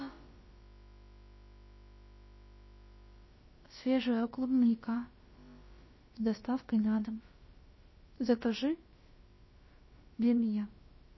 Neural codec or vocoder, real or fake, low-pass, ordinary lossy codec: codec, 16 kHz, about 1 kbps, DyCAST, with the encoder's durations; fake; 7.2 kHz; MP3, 24 kbps